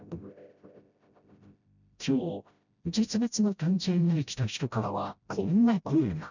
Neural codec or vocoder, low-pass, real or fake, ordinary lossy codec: codec, 16 kHz, 0.5 kbps, FreqCodec, smaller model; 7.2 kHz; fake; none